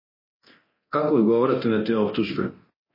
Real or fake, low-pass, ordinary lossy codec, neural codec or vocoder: fake; 5.4 kHz; MP3, 24 kbps; codec, 24 kHz, 0.9 kbps, DualCodec